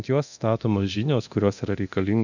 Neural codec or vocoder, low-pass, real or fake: codec, 24 kHz, 0.9 kbps, DualCodec; 7.2 kHz; fake